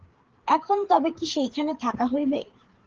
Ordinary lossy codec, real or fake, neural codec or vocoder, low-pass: Opus, 16 kbps; fake; codec, 16 kHz, 4 kbps, FreqCodec, larger model; 7.2 kHz